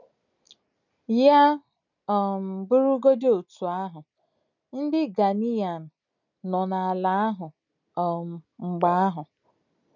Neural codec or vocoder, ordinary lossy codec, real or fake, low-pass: none; none; real; 7.2 kHz